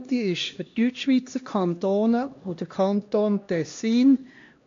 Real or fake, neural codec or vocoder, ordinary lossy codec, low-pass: fake; codec, 16 kHz, 1 kbps, X-Codec, HuBERT features, trained on LibriSpeech; AAC, 48 kbps; 7.2 kHz